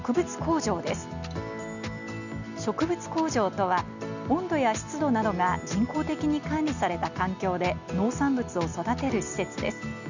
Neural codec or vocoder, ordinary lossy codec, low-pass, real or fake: none; none; 7.2 kHz; real